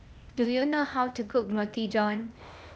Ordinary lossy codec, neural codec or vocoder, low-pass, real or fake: none; codec, 16 kHz, 0.8 kbps, ZipCodec; none; fake